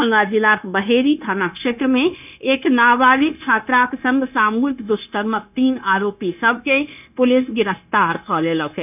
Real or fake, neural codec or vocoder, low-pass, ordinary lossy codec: fake; codec, 16 kHz, 0.9 kbps, LongCat-Audio-Codec; 3.6 kHz; none